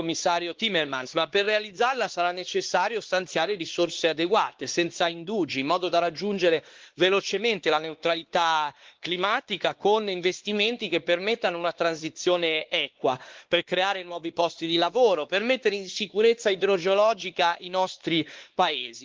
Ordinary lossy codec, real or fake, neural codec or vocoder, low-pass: Opus, 16 kbps; fake; codec, 16 kHz, 2 kbps, X-Codec, WavLM features, trained on Multilingual LibriSpeech; 7.2 kHz